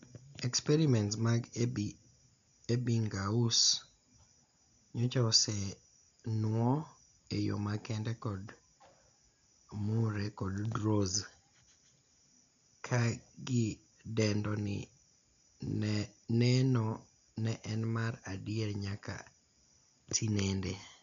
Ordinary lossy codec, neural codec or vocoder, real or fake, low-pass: none; none; real; 7.2 kHz